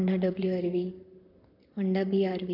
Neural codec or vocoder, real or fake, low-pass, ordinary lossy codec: vocoder, 44.1 kHz, 128 mel bands, Pupu-Vocoder; fake; 5.4 kHz; none